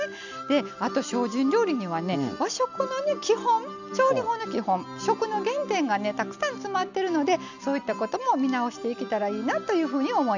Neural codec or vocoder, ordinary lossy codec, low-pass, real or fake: none; none; 7.2 kHz; real